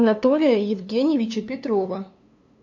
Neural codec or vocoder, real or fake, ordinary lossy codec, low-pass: codec, 16 kHz, 2 kbps, FunCodec, trained on LibriTTS, 25 frames a second; fake; MP3, 64 kbps; 7.2 kHz